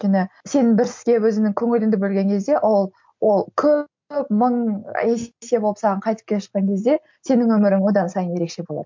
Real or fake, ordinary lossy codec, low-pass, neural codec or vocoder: real; MP3, 48 kbps; 7.2 kHz; none